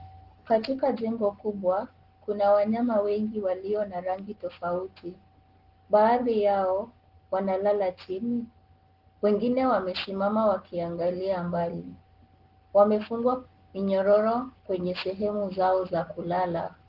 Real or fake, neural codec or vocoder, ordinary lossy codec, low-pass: real; none; Opus, 16 kbps; 5.4 kHz